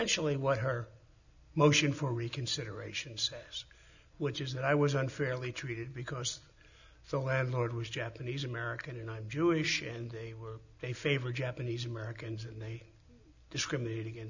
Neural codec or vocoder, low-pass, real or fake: none; 7.2 kHz; real